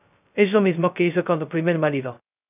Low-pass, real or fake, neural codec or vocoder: 3.6 kHz; fake; codec, 16 kHz, 0.2 kbps, FocalCodec